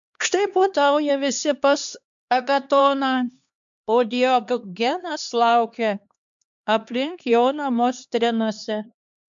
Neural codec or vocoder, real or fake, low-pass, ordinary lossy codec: codec, 16 kHz, 2 kbps, X-Codec, HuBERT features, trained on LibriSpeech; fake; 7.2 kHz; MP3, 64 kbps